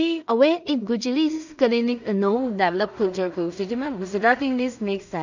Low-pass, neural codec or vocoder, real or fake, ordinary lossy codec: 7.2 kHz; codec, 16 kHz in and 24 kHz out, 0.4 kbps, LongCat-Audio-Codec, two codebook decoder; fake; none